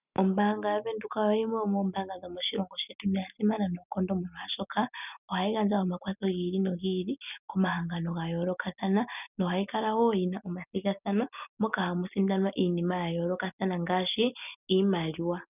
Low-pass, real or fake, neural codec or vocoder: 3.6 kHz; real; none